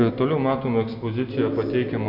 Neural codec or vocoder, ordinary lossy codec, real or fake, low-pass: none; AAC, 48 kbps; real; 5.4 kHz